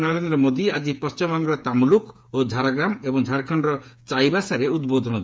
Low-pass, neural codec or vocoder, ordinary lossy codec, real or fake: none; codec, 16 kHz, 8 kbps, FreqCodec, smaller model; none; fake